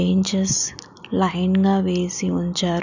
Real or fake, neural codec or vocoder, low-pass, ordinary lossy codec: real; none; 7.2 kHz; none